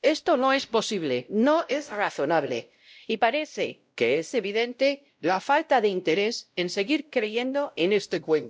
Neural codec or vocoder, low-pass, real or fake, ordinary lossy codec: codec, 16 kHz, 0.5 kbps, X-Codec, WavLM features, trained on Multilingual LibriSpeech; none; fake; none